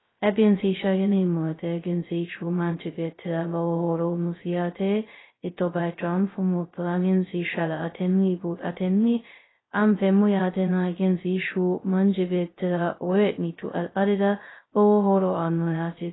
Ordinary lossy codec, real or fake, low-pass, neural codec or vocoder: AAC, 16 kbps; fake; 7.2 kHz; codec, 16 kHz, 0.2 kbps, FocalCodec